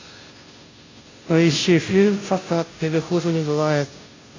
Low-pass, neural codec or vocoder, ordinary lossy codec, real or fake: 7.2 kHz; codec, 16 kHz, 0.5 kbps, FunCodec, trained on Chinese and English, 25 frames a second; AAC, 32 kbps; fake